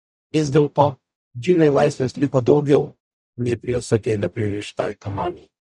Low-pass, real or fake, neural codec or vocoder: 10.8 kHz; fake; codec, 44.1 kHz, 0.9 kbps, DAC